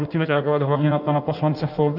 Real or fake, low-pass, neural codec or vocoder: fake; 5.4 kHz; codec, 16 kHz in and 24 kHz out, 1.1 kbps, FireRedTTS-2 codec